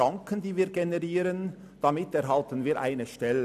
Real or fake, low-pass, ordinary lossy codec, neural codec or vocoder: real; 14.4 kHz; none; none